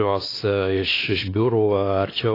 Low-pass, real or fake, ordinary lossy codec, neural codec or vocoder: 5.4 kHz; fake; AAC, 24 kbps; codec, 16 kHz, 2 kbps, X-Codec, WavLM features, trained on Multilingual LibriSpeech